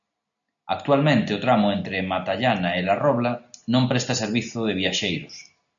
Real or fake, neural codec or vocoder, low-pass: real; none; 7.2 kHz